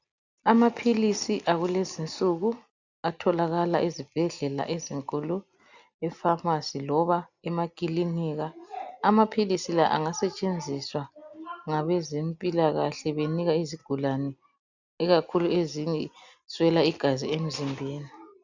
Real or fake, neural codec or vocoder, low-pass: real; none; 7.2 kHz